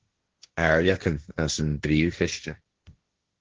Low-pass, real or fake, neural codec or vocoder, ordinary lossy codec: 7.2 kHz; fake; codec, 16 kHz, 1.1 kbps, Voila-Tokenizer; Opus, 16 kbps